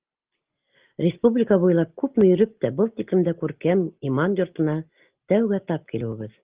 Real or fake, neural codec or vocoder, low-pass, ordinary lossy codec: real; none; 3.6 kHz; Opus, 32 kbps